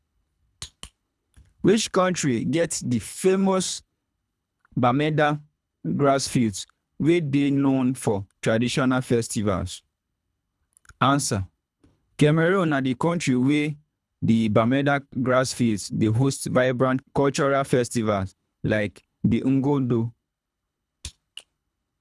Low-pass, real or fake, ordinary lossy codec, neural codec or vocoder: none; fake; none; codec, 24 kHz, 3 kbps, HILCodec